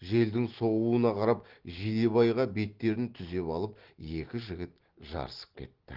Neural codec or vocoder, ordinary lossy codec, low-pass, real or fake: none; Opus, 16 kbps; 5.4 kHz; real